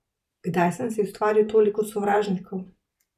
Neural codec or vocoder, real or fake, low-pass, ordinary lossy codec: vocoder, 44.1 kHz, 128 mel bands every 512 samples, BigVGAN v2; fake; 14.4 kHz; none